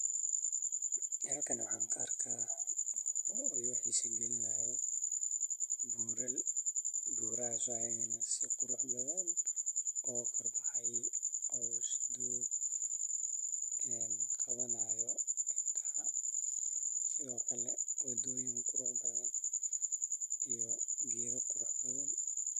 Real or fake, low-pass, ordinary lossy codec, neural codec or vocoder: real; 14.4 kHz; MP3, 96 kbps; none